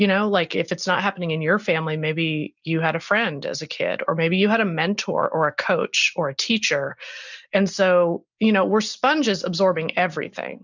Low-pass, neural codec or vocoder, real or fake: 7.2 kHz; none; real